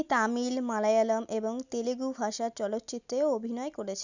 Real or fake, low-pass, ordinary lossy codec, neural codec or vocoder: real; 7.2 kHz; none; none